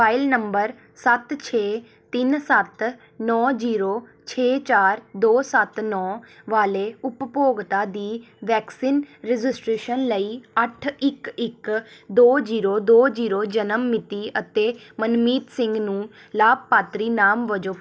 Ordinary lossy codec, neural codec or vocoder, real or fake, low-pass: none; none; real; none